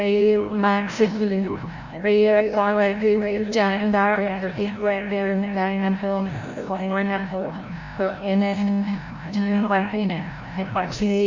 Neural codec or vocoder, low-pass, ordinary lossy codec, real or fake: codec, 16 kHz, 0.5 kbps, FreqCodec, larger model; 7.2 kHz; Opus, 64 kbps; fake